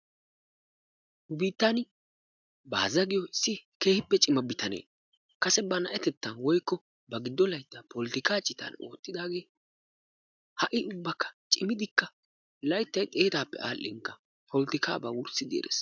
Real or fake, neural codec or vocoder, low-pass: real; none; 7.2 kHz